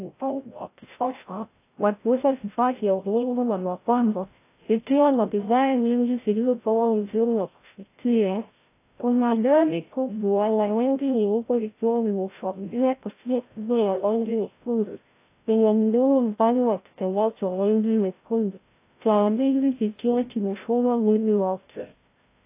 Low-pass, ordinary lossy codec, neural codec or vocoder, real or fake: 3.6 kHz; AAC, 24 kbps; codec, 16 kHz, 0.5 kbps, FreqCodec, larger model; fake